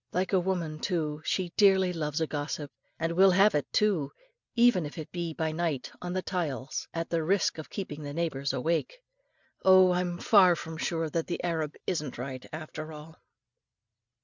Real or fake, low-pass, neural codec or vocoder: real; 7.2 kHz; none